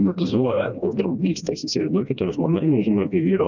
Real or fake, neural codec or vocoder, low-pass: fake; codec, 16 kHz, 1 kbps, FreqCodec, smaller model; 7.2 kHz